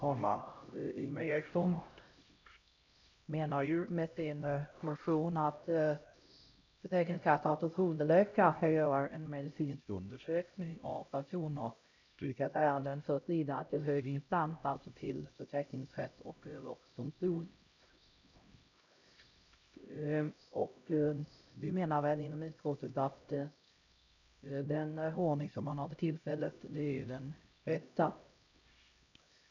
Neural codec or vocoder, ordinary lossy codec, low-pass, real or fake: codec, 16 kHz, 0.5 kbps, X-Codec, HuBERT features, trained on LibriSpeech; none; 7.2 kHz; fake